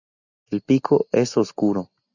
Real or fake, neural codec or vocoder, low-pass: real; none; 7.2 kHz